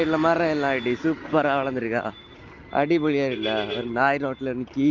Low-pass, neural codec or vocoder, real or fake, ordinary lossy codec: 7.2 kHz; none; real; Opus, 32 kbps